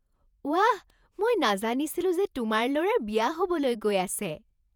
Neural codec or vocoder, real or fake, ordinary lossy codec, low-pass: vocoder, 48 kHz, 128 mel bands, Vocos; fake; none; 19.8 kHz